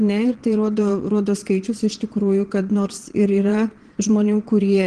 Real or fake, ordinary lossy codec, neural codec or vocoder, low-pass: fake; Opus, 16 kbps; vocoder, 22.05 kHz, 80 mel bands, Vocos; 9.9 kHz